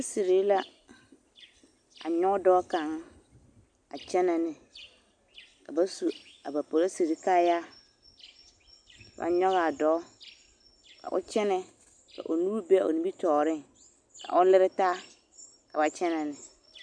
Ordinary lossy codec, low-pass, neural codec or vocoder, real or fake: AAC, 64 kbps; 9.9 kHz; none; real